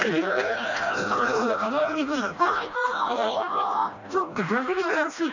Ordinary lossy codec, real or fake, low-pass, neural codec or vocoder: none; fake; 7.2 kHz; codec, 16 kHz, 1 kbps, FreqCodec, smaller model